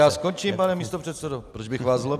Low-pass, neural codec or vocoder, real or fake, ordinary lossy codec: 14.4 kHz; none; real; AAC, 96 kbps